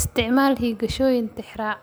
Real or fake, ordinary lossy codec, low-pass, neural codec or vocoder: real; none; none; none